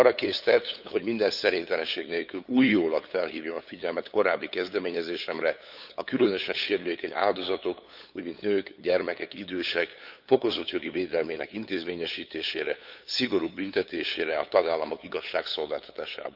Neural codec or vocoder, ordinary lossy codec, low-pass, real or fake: codec, 16 kHz, 8 kbps, FunCodec, trained on LibriTTS, 25 frames a second; none; 5.4 kHz; fake